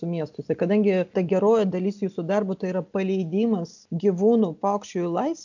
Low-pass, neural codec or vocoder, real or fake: 7.2 kHz; none; real